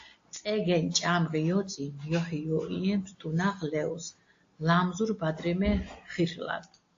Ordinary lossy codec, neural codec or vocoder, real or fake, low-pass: AAC, 48 kbps; none; real; 7.2 kHz